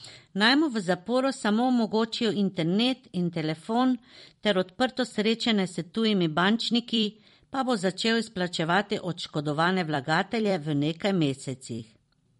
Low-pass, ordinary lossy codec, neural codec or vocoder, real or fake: 19.8 kHz; MP3, 48 kbps; vocoder, 44.1 kHz, 128 mel bands every 256 samples, BigVGAN v2; fake